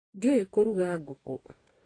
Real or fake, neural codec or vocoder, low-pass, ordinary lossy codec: fake; codec, 44.1 kHz, 2.6 kbps, DAC; 9.9 kHz; AAC, 32 kbps